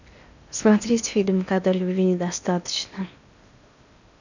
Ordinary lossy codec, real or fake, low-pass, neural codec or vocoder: none; fake; 7.2 kHz; codec, 16 kHz in and 24 kHz out, 0.8 kbps, FocalCodec, streaming, 65536 codes